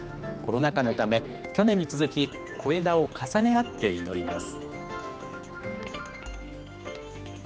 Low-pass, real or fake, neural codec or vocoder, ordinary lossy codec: none; fake; codec, 16 kHz, 2 kbps, X-Codec, HuBERT features, trained on general audio; none